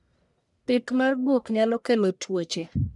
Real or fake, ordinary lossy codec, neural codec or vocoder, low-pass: fake; none; codec, 44.1 kHz, 1.7 kbps, Pupu-Codec; 10.8 kHz